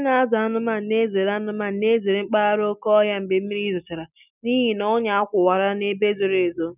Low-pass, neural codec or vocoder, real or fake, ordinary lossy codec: 3.6 kHz; none; real; none